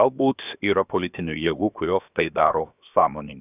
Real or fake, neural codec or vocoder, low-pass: fake; codec, 16 kHz, 0.7 kbps, FocalCodec; 3.6 kHz